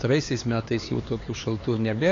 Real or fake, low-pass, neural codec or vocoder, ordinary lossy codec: fake; 7.2 kHz; codec, 16 kHz, 4 kbps, X-Codec, HuBERT features, trained on LibriSpeech; AAC, 32 kbps